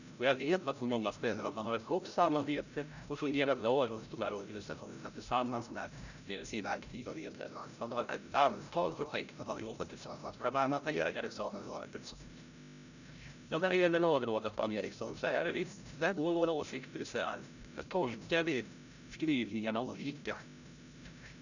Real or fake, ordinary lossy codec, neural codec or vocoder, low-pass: fake; none; codec, 16 kHz, 0.5 kbps, FreqCodec, larger model; 7.2 kHz